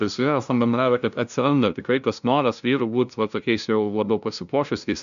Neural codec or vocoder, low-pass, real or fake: codec, 16 kHz, 0.5 kbps, FunCodec, trained on LibriTTS, 25 frames a second; 7.2 kHz; fake